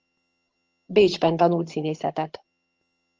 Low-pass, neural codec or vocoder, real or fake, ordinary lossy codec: 7.2 kHz; vocoder, 22.05 kHz, 80 mel bands, HiFi-GAN; fake; Opus, 24 kbps